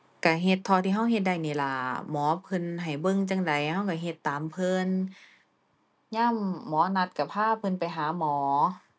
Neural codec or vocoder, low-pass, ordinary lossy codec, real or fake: none; none; none; real